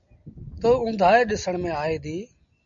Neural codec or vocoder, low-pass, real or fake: none; 7.2 kHz; real